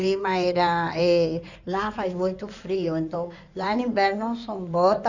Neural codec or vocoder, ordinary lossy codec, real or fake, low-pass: codec, 16 kHz in and 24 kHz out, 2.2 kbps, FireRedTTS-2 codec; none; fake; 7.2 kHz